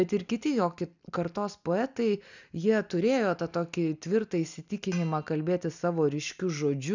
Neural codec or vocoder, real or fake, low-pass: none; real; 7.2 kHz